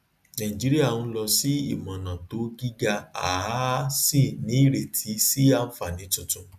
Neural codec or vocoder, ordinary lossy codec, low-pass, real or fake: none; none; 14.4 kHz; real